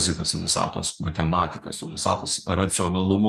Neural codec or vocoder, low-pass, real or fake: codec, 44.1 kHz, 2.6 kbps, DAC; 14.4 kHz; fake